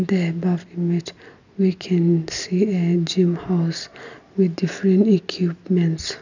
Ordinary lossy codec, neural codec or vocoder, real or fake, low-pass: none; none; real; 7.2 kHz